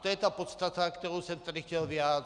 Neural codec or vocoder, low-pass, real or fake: none; 10.8 kHz; real